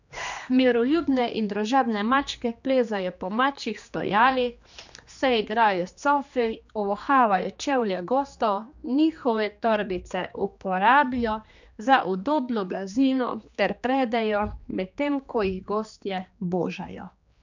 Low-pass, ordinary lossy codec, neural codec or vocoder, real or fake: 7.2 kHz; none; codec, 16 kHz, 2 kbps, X-Codec, HuBERT features, trained on general audio; fake